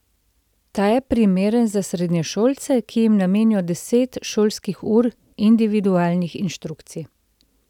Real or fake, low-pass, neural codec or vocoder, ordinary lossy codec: real; 19.8 kHz; none; none